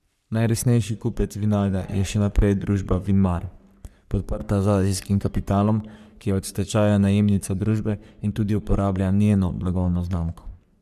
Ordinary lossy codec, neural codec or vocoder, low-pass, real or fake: none; codec, 44.1 kHz, 3.4 kbps, Pupu-Codec; 14.4 kHz; fake